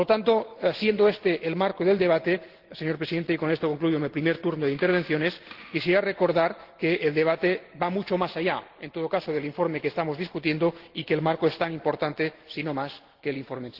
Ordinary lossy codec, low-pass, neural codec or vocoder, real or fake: Opus, 16 kbps; 5.4 kHz; none; real